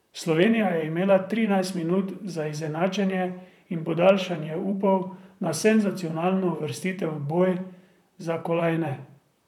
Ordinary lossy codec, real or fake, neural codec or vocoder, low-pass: none; fake; vocoder, 44.1 kHz, 128 mel bands, Pupu-Vocoder; 19.8 kHz